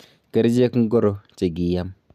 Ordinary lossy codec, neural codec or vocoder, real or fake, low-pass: none; none; real; 14.4 kHz